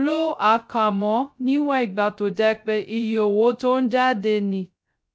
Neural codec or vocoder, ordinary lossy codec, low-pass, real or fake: codec, 16 kHz, 0.2 kbps, FocalCodec; none; none; fake